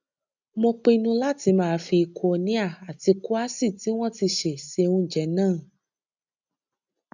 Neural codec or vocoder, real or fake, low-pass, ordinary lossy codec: none; real; 7.2 kHz; none